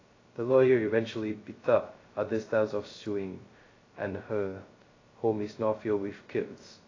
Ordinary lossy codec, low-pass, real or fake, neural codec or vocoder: AAC, 32 kbps; 7.2 kHz; fake; codec, 16 kHz, 0.2 kbps, FocalCodec